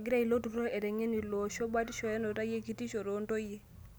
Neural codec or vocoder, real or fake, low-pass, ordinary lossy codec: none; real; none; none